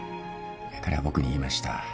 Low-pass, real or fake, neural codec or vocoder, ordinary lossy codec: none; real; none; none